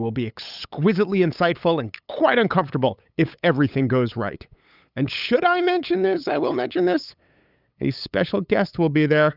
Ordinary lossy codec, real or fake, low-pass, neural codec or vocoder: Opus, 64 kbps; fake; 5.4 kHz; codec, 16 kHz, 8 kbps, FunCodec, trained on LibriTTS, 25 frames a second